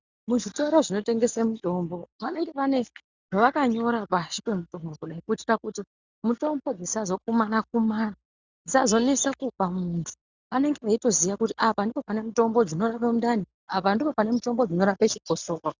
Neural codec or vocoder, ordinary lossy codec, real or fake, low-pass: none; Opus, 64 kbps; real; 7.2 kHz